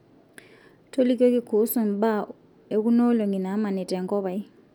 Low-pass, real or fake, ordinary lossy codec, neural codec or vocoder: 19.8 kHz; real; none; none